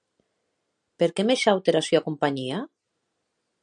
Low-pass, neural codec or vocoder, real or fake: 9.9 kHz; none; real